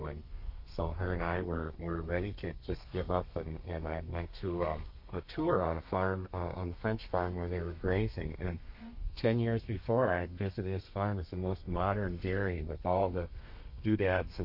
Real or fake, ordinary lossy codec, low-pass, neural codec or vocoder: fake; MP3, 32 kbps; 5.4 kHz; codec, 32 kHz, 1.9 kbps, SNAC